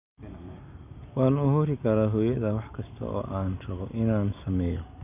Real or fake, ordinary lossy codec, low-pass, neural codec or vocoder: real; none; 3.6 kHz; none